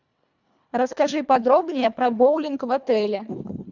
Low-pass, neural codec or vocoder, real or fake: 7.2 kHz; codec, 24 kHz, 1.5 kbps, HILCodec; fake